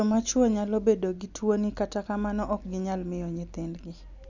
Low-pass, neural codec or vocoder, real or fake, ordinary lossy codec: 7.2 kHz; none; real; none